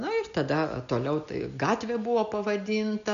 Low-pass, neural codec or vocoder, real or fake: 7.2 kHz; none; real